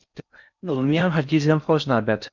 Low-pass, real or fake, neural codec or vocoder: 7.2 kHz; fake; codec, 16 kHz in and 24 kHz out, 0.6 kbps, FocalCodec, streaming, 4096 codes